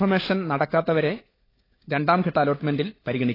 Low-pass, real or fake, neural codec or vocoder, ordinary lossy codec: 5.4 kHz; fake; codec, 16 kHz, 16 kbps, FunCodec, trained on LibriTTS, 50 frames a second; AAC, 24 kbps